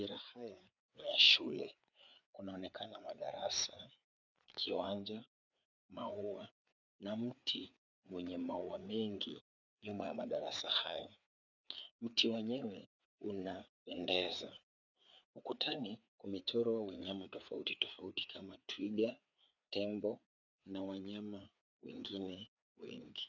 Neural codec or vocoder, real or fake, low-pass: codec, 16 kHz, 4 kbps, FreqCodec, larger model; fake; 7.2 kHz